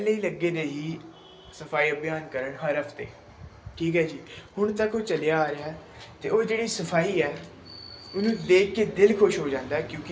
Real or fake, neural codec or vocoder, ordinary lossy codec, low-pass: real; none; none; none